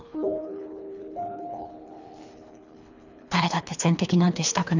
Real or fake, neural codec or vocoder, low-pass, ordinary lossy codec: fake; codec, 24 kHz, 3 kbps, HILCodec; 7.2 kHz; none